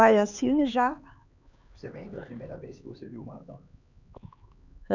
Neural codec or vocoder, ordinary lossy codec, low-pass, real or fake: codec, 16 kHz, 4 kbps, X-Codec, HuBERT features, trained on LibriSpeech; none; 7.2 kHz; fake